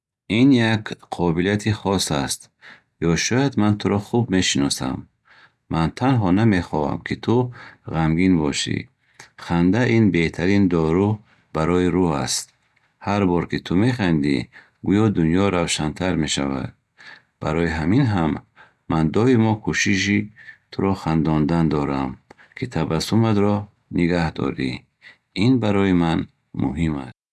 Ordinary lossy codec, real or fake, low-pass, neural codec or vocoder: none; real; none; none